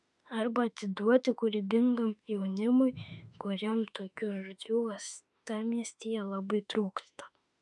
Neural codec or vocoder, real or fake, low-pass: autoencoder, 48 kHz, 32 numbers a frame, DAC-VAE, trained on Japanese speech; fake; 10.8 kHz